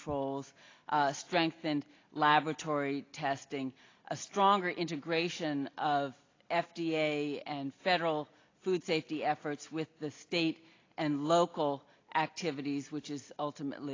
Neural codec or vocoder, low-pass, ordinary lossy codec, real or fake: none; 7.2 kHz; AAC, 32 kbps; real